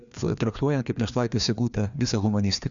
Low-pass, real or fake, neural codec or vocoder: 7.2 kHz; fake; codec, 16 kHz, 2 kbps, FreqCodec, larger model